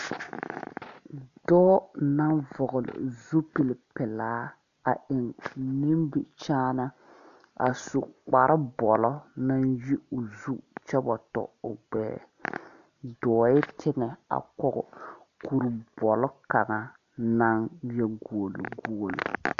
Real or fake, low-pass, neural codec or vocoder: real; 7.2 kHz; none